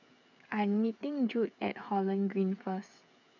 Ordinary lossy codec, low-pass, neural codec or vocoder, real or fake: none; 7.2 kHz; codec, 16 kHz, 8 kbps, FreqCodec, smaller model; fake